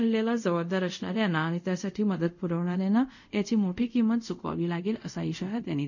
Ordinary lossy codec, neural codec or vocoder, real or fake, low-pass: none; codec, 24 kHz, 0.5 kbps, DualCodec; fake; 7.2 kHz